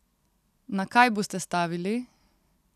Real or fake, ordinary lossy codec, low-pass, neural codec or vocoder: real; none; 14.4 kHz; none